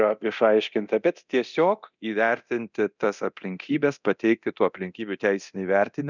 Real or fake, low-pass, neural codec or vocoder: fake; 7.2 kHz; codec, 24 kHz, 0.9 kbps, DualCodec